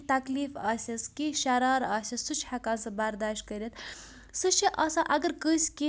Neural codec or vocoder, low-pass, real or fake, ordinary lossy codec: none; none; real; none